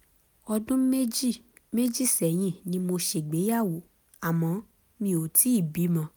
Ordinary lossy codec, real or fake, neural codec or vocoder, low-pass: none; real; none; none